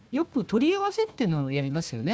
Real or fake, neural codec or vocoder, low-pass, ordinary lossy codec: fake; codec, 16 kHz, 1 kbps, FunCodec, trained on Chinese and English, 50 frames a second; none; none